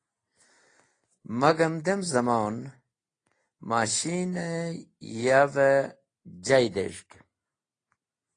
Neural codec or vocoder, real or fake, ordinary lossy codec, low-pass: none; real; AAC, 32 kbps; 9.9 kHz